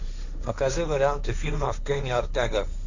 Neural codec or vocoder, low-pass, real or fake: codec, 16 kHz, 1.1 kbps, Voila-Tokenizer; 7.2 kHz; fake